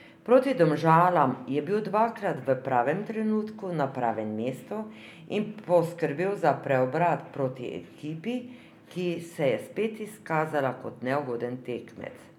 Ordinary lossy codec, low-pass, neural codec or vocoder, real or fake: none; 19.8 kHz; none; real